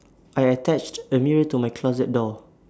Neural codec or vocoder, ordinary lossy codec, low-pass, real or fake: none; none; none; real